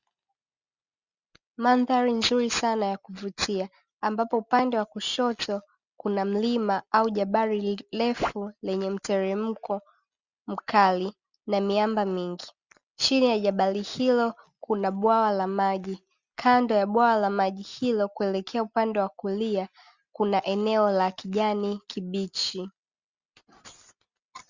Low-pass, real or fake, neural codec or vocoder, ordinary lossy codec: 7.2 kHz; real; none; Opus, 64 kbps